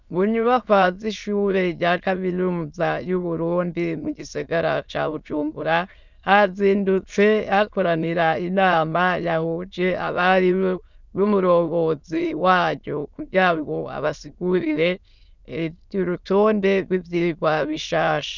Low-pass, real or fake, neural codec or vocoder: 7.2 kHz; fake; autoencoder, 22.05 kHz, a latent of 192 numbers a frame, VITS, trained on many speakers